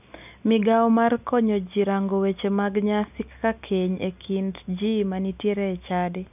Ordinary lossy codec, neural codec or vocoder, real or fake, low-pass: none; none; real; 3.6 kHz